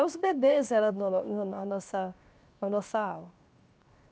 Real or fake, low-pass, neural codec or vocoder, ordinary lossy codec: fake; none; codec, 16 kHz, 0.7 kbps, FocalCodec; none